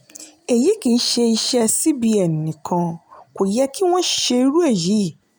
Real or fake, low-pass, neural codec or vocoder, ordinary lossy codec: real; none; none; none